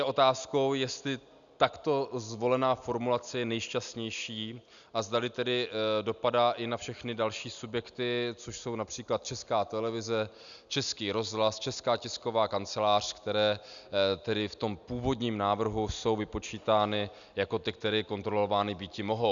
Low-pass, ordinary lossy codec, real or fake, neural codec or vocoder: 7.2 kHz; MP3, 96 kbps; real; none